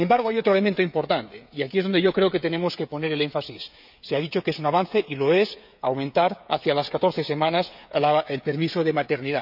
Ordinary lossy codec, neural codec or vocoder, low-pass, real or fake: none; codec, 16 kHz, 16 kbps, FreqCodec, smaller model; 5.4 kHz; fake